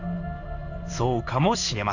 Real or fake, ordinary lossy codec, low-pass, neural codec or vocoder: fake; none; 7.2 kHz; codec, 16 kHz in and 24 kHz out, 1 kbps, XY-Tokenizer